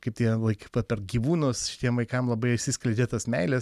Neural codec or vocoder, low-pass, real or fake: none; 14.4 kHz; real